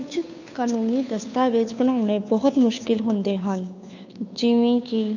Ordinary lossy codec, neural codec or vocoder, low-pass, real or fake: none; codec, 16 kHz, 2 kbps, FunCodec, trained on Chinese and English, 25 frames a second; 7.2 kHz; fake